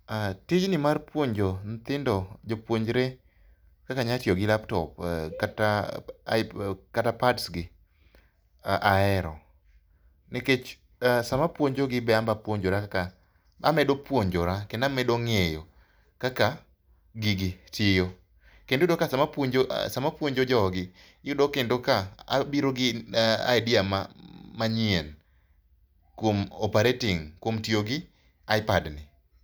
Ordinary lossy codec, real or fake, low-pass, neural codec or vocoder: none; real; none; none